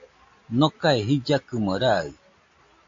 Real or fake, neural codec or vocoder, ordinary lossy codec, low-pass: real; none; AAC, 48 kbps; 7.2 kHz